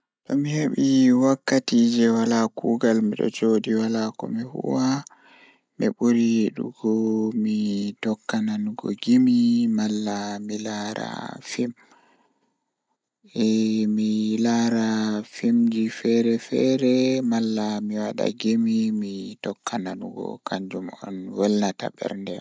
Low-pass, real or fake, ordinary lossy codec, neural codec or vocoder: none; real; none; none